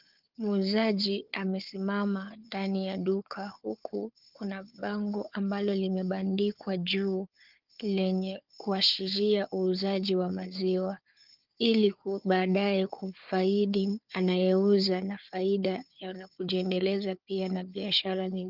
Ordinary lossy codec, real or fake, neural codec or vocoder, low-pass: Opus, 32 kbps; fake; codec, 16 kHz, 4 kbps, FunCodec, trained on Chinese and English, 50 frames a second; 5.4 kHz